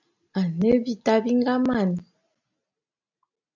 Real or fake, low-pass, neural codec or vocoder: real; 7.2 kHz; none